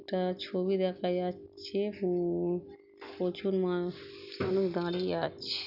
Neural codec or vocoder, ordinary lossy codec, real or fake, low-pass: none; none; real; 5.4 kHz